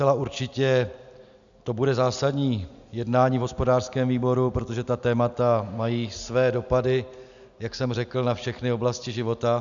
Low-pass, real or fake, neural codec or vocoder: 7.2 kHz; real; none